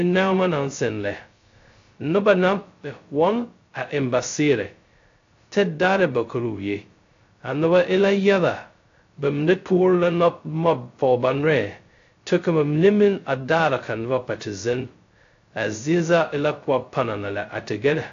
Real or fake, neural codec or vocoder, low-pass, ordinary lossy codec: fake; codec, 16 kHz, 0.2 kbps, FocalCodec; 7.2 kHz; AAC, 48 kbps